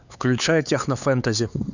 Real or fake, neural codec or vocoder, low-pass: fake; codec, 16 kHz, 4 kbps, X-Codec, HuBERT features, trained on LibriSpeech; 7.2 kHz